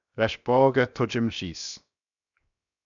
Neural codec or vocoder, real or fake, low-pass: codec, 16 kHz, 0.7 kbps, FocalCodec; fake; 7.2 kHz